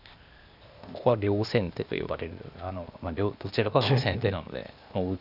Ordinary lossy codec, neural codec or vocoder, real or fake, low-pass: none; codec, 16 kHz, 0.8 kbps, ZipCodec; fake; 5.4 kHz